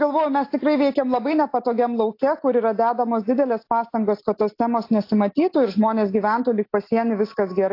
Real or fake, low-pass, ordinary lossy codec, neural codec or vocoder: real; 5.4 kHz; AAC, 32 kbps; none